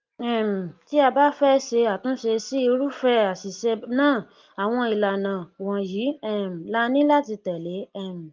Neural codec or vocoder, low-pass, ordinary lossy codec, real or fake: none; 7.2 kHz; Opus, 32 kbps; real